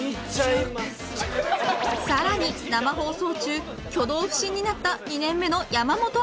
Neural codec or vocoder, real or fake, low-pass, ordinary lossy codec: none; real; none; none